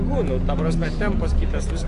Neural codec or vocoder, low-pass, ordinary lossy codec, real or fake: none; 10.8 kHz; AAC, 48 kbps; real